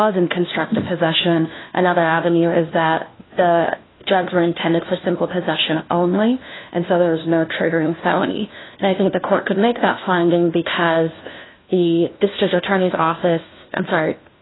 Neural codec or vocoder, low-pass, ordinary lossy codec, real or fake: codec, 16 kHz, 1 kbps, FunCodec, trained on LibriTTS, 50 frames a second; 7.2 kHz; AAC, 16 kbps; fake